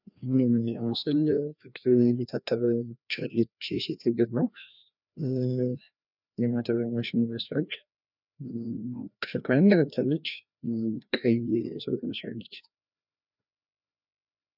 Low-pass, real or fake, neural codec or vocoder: 5.4 kHz; fake; codec, 16 kHz, 1 kbps, FreqCodec, larger model